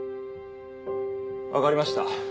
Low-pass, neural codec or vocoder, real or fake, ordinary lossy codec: none; none; real; none